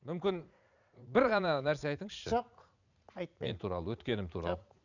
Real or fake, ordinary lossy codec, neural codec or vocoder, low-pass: fake; none; vocoder, 44.1 kHz, 80 mel bands, Vocos; 7.2 kHz